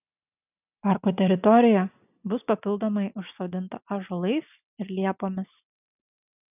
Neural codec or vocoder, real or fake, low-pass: none; real; 3.6 kHz